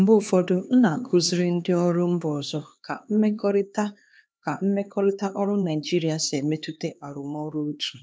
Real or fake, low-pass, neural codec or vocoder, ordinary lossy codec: fake; none; codec, 16 kHz, 2 kbps, X-Codec, HuBERT features, trained on LibriSpeech; none